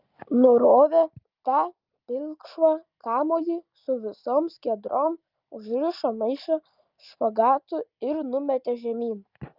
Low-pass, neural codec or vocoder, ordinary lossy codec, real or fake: 5.4 kHz; none; Opus, 24 kbps; real